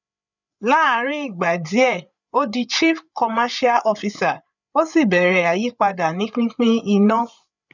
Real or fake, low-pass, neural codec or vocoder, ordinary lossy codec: fake; 7.2 kHz; codec, 16 kHz, 8 kbps, FreqCodec, larger model; none